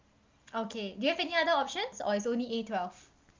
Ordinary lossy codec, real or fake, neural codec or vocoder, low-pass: Opus, 24 kbps; real; none; 7.2 kHz